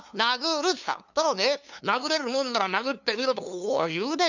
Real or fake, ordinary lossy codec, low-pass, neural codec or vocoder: fake; none; 7.2 kHz; codec, 16 kHz, 2 kbps, FunCodec, trained on LibriTTS, 25 frames a second